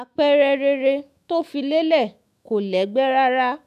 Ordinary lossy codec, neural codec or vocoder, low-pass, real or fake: none; autoencoder, 48 kHz, 128 numbers a frame, DAC-VAE, trained on Japanese speech; 14.4 kHz; fake